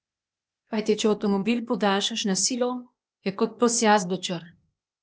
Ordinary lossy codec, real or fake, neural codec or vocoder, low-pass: none; fake; codec, 16 kHz, 0.8 kbps, ZipCodec; none